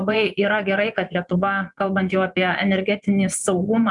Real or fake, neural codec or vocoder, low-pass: fake; vocoder, 24 kHz, 100 mel bands, Vocos; 10.8 kHz